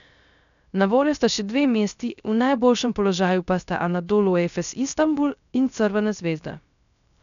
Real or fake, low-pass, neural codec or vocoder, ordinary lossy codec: fake; 7.2 kHz; codec, 16 kHz, 0.3 kbps, FocalCodec; none